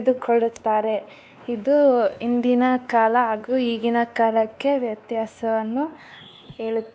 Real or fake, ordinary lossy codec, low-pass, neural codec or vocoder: fake; none; none; codec, 16 kHz, 2 kbps, X-Codec, WavLM features, trained on Multilingual LibriSpeech